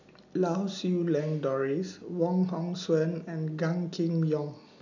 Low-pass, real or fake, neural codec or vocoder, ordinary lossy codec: 7.2 kHz; real; none; none